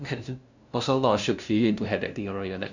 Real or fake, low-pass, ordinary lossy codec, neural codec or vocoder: fake; 7.2 kHz; none; codec, 16 kHz, 0.5 kbps, FunCodec, trained on LibriTTS, 25 frames a second